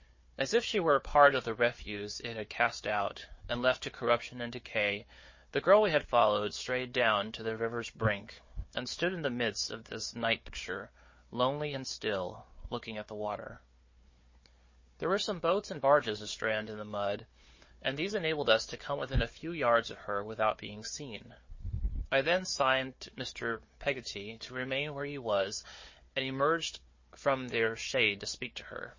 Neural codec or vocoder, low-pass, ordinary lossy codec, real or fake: codec, 16 kHz, 4 kbps, FunCodec, trained on Chinese and English, 50 frames a second; 7.2 kHz; MP3, 32 kbps; fake